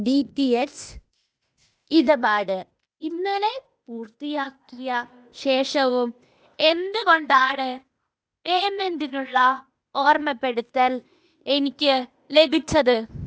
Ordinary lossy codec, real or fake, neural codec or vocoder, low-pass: none; fake; codec, 16 kHz, 0.8 kbps, ZipCodec; none